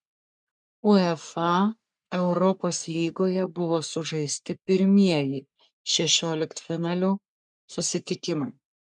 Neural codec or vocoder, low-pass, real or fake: codec, 44.1 kHz, 3.4 kbps, Pupu-Codec; 10.8 kHz; fake